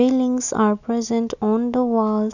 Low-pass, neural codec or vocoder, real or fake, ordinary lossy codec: 7.2 kHz; none; real; none